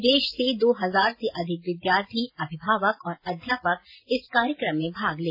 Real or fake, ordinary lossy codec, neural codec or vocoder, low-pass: real; AAC, 32 kbps; none; 5.4 kHz